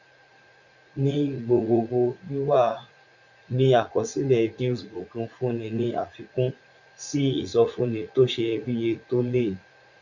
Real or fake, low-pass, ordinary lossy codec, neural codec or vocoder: fake; 7.2 kHz; none; vocoder, 44.1 kHz, 80 mel bands, Vocos